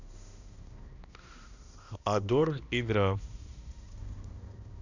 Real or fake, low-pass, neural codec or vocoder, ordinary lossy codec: fake; 7.2 kHz; codec, 16 kHz, 1 kbps, X-Codec, HuBERT features, trained on balanced general audio; none